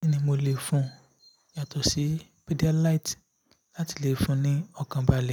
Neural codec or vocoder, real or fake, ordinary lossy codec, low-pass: none; real; none; none